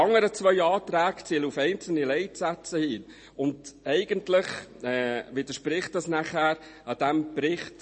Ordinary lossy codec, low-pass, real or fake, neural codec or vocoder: MP3, 32 kbps; 10.8 kHz; real; none